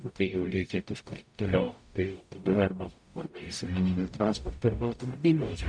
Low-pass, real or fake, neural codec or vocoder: 9.9 kHz; fake; codec, 44.1 kHz, 0.9 kbps, DAC